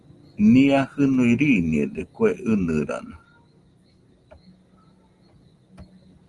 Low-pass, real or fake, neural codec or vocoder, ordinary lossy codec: 10.8 kHz; real; none; Opus, 24 kbps